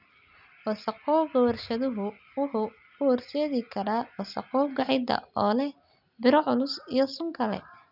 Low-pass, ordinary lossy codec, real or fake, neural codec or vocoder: 5.4 kHz; none; real; none